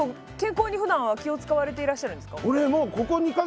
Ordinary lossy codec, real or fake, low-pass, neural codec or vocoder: none; real; none; none